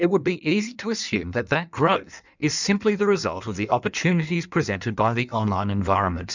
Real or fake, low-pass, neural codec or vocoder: fake; 7.2 kHz; codec, 16 kHz in and 24 kHz out, 1.1 kbps, FireRedTTS-2 codec